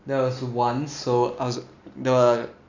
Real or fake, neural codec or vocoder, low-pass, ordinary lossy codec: real; none; 7.2 kHz; none